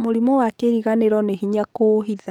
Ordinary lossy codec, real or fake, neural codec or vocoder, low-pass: none; fake; codec, 44.1 kHz, 7.8 kbps, DAC; 19.8 kHz